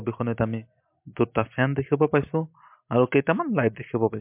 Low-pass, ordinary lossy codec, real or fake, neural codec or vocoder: 3.6 kHz; MP3, 32 kbps; real; none